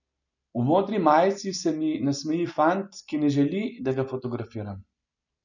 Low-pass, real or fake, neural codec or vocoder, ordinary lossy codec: 7.2 kHz; real; none; none